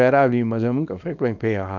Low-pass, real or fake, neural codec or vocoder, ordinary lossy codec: 7.2 kHz; fake; codec, 24 kHz, 0.9 kbps, WavTokenizer, small release; none